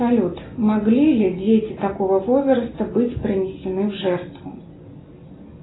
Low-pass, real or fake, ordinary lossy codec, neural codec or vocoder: 7.2 kHz; real; AAC, 16 kbps; none